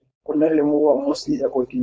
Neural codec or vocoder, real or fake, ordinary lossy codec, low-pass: codec, 16 kHz, 4.8 kbps, FACodec; fake; none; none